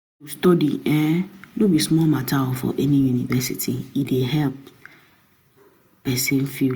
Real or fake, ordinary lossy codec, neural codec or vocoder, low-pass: real; none; none; none